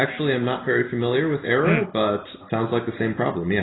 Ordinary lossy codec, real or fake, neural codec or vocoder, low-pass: AAC, 16 kbps; real; none; 7.2 kHz